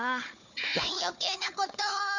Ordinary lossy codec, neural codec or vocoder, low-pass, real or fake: none; codec, 16 kHz, 4 kbps, FunCodec, trained on Chinese and English, 50 frames a second; 7.2 kHz; fake